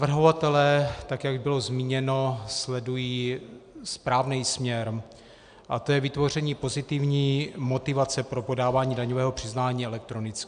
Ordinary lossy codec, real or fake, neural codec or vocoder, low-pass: AAC, 96 kbps; real; none; 9.9 kHz